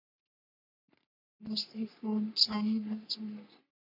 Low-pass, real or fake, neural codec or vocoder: 5.4 kHz; fake; codec, 44.1 kHz, 3.4 kbps, Pupu-Codec